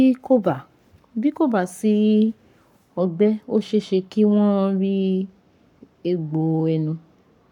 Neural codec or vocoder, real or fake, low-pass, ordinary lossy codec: codec, 44.1 kHz, 7.8 kbps, Pupu-Codec; fake; 19.8 kHz; none